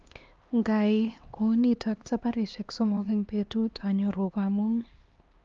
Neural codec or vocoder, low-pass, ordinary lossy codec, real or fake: codec, 16 kHz, 2 kbps, X-Codec, HuBERT features, trained on LibriSpeech; 7.2 kHz; Opus, 32 kbps; fake